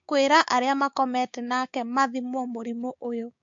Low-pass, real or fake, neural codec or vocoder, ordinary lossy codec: 7.2 kHz; fake; codec, 16 kHz, 6 kbps, DAC; MP3, 48 kbps